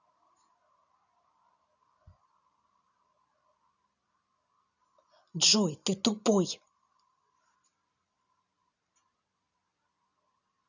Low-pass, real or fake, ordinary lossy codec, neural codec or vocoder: 7.2 kHz; real; none; none